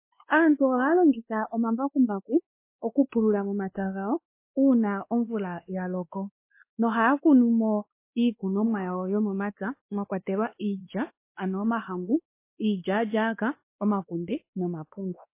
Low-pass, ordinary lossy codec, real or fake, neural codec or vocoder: 3.6 kHz; MP3, 24 kbps; fake; codec, 16 kHz, 2 kbps, X-Codec, WavLM features, trained on Multilingual LibriSpeech